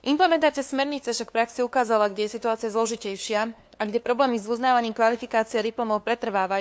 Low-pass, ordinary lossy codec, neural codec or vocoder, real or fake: none; none; codec, 16 kHz, 2 kbps, FunCodec, trained on LibriTTS, 25 frames a second; fake